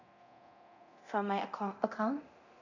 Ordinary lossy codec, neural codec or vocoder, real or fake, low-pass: none; codec, 24 kHz, 0.9 kbps, DualCodec; fake; 7.2 kHz